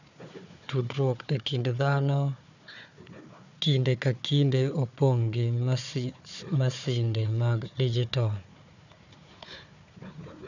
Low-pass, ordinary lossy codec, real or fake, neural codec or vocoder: 7.2 kHz; none; fake; codec, 16 kHz, 4 kbps, FunCodec, trained on Chinese and English, 50 frames a second